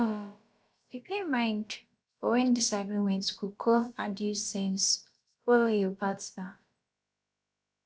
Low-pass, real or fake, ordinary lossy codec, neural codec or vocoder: none; fake; none; codec, 16 kHz, about 1 kbps, DyCAST, with the encoder's durations